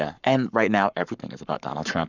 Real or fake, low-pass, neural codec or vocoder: fake; 7.2 kHz; codec, 44.1 kHz, 7.8 kbps, Pupu-Codec